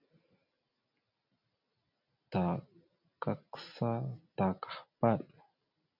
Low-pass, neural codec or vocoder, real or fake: 5.4 kHz; none; real